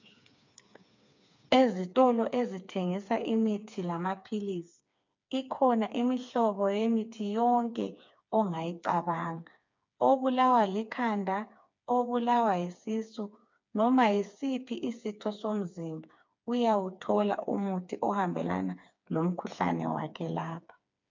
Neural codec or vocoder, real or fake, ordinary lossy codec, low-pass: codec, 16 kHz, 4 kbps, FreqCodec, smaller model; fake; MP3, 64 kbps; 7.2 kHz